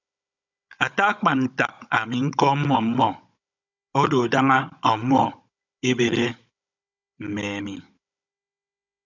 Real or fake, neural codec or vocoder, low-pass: fake; codec, 16 kHz, 16 kbps, FunCodec, trained on Chinese and English, 50 frames a second; 7.2 kHz